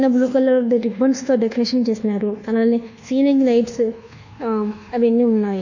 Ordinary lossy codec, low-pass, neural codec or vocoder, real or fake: none; 7.2 kHz; codec, 24 kHz, 1.2 kbps, DualCodec; fake